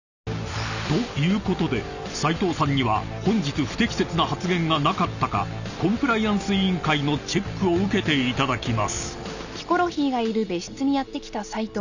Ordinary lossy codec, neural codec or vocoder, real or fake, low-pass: none; none; real; 7.2 kHz